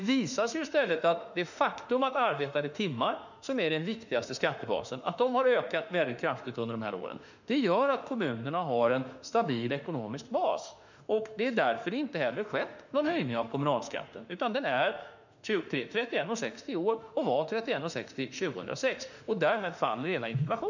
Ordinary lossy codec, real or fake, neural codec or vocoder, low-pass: none; fake; autoencoder, 48 kHz, 32 numbers a frame, DAC-VAE, trained on Japanese speech; 7.2 kHz